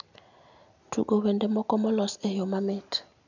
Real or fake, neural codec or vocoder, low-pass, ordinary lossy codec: real; none; 7.2 kHz; none